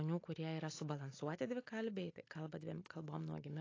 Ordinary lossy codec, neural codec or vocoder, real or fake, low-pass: AAC, 32 kbps; none; real; 7.2 kHz